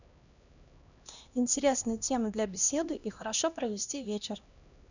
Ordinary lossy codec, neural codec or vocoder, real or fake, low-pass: none; codec, 16 kHz, 1 kbps, X-Codec, HuBERT features, trained on LibriSpeech; fake; 7.2 kHz